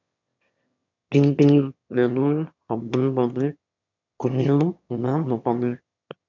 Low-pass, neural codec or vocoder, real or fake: 7.2 kHz; autoencoder, 22.05 kHz, a latent of 192 numbers a frame, VITS, trained on one speaker; fake